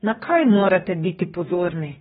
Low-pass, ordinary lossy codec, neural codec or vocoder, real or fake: 19.8 kHz; AAC, 16 kbps; codec, 44.1 kHz, 2.6 kbps, DAC; fake